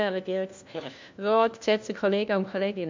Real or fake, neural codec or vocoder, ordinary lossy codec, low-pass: fake; codec, 16 kHz, 1 kbps, FunCodec, trained on LibriTTS, 50 frames a second; MP3, 64 kbps; 7.2 kHz